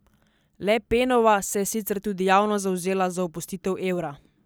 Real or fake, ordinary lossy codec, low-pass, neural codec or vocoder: real; none; none; none